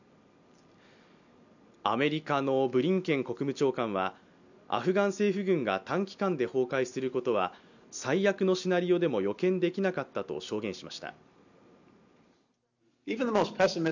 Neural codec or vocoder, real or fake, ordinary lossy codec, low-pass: none; real; none; 7.2 kHz